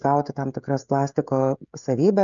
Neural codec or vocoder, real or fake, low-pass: codec, 16 kHz, 16 kbps, FreqCodec, smaller model; fake; 7.2 kHz